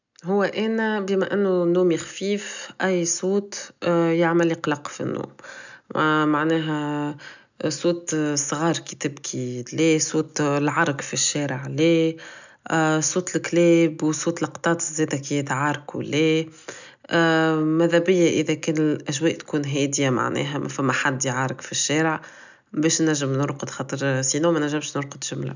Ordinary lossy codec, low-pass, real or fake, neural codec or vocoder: none; 7.2 kHz; real; none